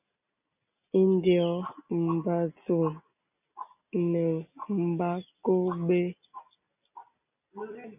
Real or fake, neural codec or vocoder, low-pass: real; none; 3.6 kHz